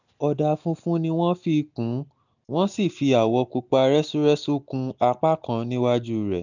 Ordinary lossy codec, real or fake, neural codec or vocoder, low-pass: none; real; none; 7.2 kHz